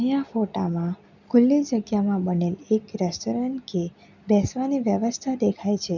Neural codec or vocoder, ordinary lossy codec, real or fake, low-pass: none; none; real; 7.2 kHz